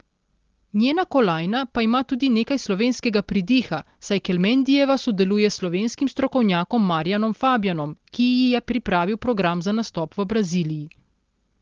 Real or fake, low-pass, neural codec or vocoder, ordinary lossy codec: real; 7.2 kHz; none; Opus, 16 kbps